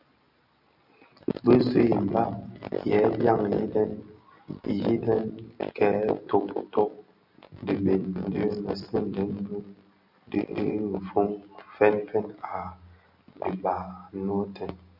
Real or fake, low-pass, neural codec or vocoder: real; 5.4 kHz; none